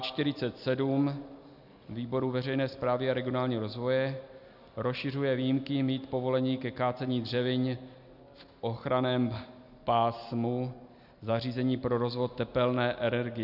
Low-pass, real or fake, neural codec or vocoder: 5.4 kHz; real; none